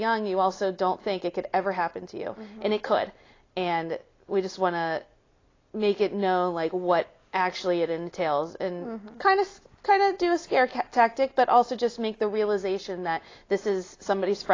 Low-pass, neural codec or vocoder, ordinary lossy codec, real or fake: 7.2 kHz; none; AAC, 32 kbps; real